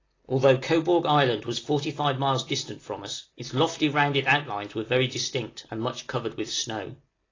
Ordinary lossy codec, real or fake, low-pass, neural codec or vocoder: AAC, 32 kbps; real; 7.2 kHz; none